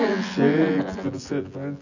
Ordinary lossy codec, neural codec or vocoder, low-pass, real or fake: none; vocoder, 24 kHz, 100 mel bands, Vocos; 7.2 kHz; fake